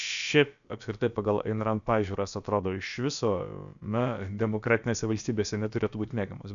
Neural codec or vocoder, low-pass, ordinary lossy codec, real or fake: codec, 16 kHz, about 1 kbps, DyCAST, with the encoder's durations; 7.2 kHz; MP3, 96 kbps; fake